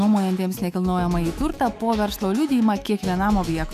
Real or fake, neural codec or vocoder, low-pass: fake; autoencoder, 48 kHz, 128 numbers a frame, DAC-VAE, trained on Japanese speech; 14.4 kHz